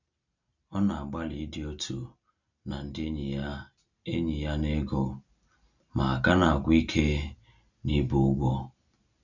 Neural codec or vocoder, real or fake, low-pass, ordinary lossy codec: none; real; 7.2 kHz; none